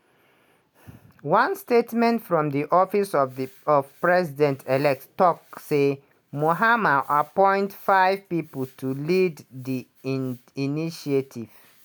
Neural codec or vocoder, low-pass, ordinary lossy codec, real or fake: none; none; none; real